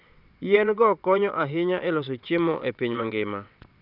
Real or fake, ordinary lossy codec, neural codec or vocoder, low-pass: fake; none; vocoder, 22.05 kHz, 80 mel bands, WaveNeXt; 5.4 kHz